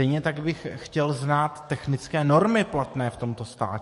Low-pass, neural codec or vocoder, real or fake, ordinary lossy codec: 14.4 kHz; codec, 44.1 kHz, 7.8 kbps, DAC; fake; MP3, 48 kbps